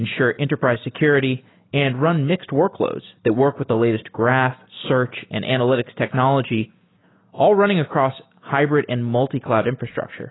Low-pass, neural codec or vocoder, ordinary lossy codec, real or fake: 7.2 kHz; none; AAC, 16 kbps; real